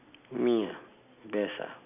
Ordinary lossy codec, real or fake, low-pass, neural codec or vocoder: none; real; 3.6 kHz; none